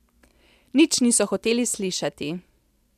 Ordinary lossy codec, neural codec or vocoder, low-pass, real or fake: none; none; 14.4 kHz; real